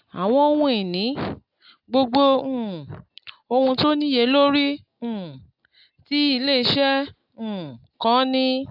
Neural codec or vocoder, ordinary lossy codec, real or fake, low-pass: none; AAC, 48 kbps; real; 5.4 kHz